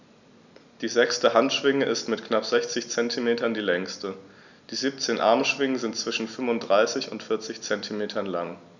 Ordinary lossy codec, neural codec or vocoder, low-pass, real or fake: none; none; 7.2 kHz; real